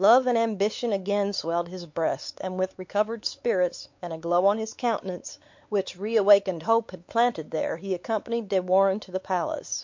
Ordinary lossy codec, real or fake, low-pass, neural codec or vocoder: MP3, 48 kbps; fake; 7.2 kHz; codec, 16 kHz, 4 kbps, X-Codec, WavLM features, trained on Multilingual LibriSpeech